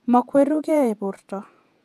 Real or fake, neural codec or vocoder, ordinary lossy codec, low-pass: fake; vocoder, 48 kHz, 128 mel bands, Vocos; AAC, 96 kbps; 14.4 kHz